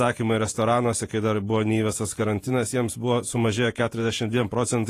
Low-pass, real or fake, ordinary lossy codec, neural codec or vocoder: 14.4 kHz; fake; AAC, 48 kbps; vocoder, 48 kHz, 128 mel bands, Vocos